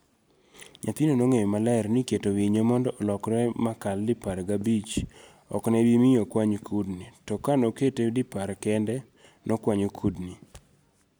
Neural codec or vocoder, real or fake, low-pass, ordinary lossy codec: none; real; none; none